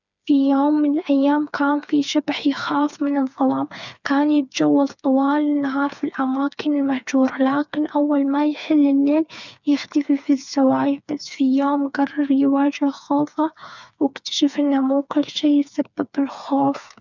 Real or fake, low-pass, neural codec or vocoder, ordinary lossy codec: fake; 7.2 kHz; codec, 16 kHz, 4 kbps, FreqCodec, smaller model; none